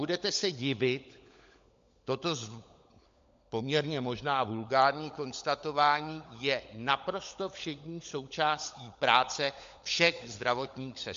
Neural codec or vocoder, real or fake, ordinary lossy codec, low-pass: codec, 16 kHz, 16 kbps, FunCodec, trained on LibriTTS, 50 frames a second; fake; MP3, 48 kbps; 7.2 kHz